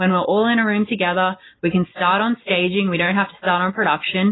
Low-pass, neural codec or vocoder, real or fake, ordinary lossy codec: 7.2 kHz; none; real; AAC, 16 kbps